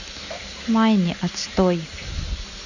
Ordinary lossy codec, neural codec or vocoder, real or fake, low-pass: none; none; real; 7.2 kHz